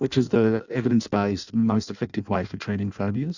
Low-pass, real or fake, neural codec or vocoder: 7.2 kHz; fake; codec, 16 kHz in and 24 kHz out, 0.6 kbps, FireRedTTS-2 codec